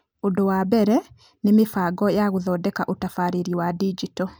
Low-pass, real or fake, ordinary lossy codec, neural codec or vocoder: none; real; none; none